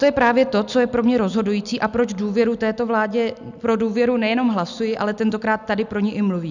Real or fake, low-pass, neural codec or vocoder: real; 7.2 kHz; none